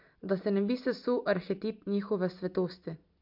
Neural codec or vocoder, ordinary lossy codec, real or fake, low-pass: none; MP3, 48 kbps; real; 5.4 kHz